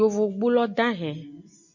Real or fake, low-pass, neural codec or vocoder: real; 7.2 kHz; none